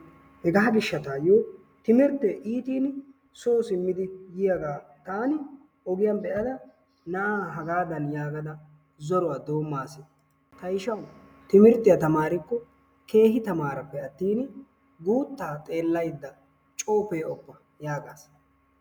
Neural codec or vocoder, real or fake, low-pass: none; real; 19.8 kHz